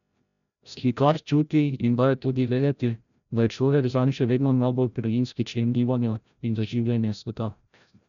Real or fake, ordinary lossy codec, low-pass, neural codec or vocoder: fake; none; 7.2 kHz; codec, 16 kHz, 0.5 kbps, FreqCodec, larger model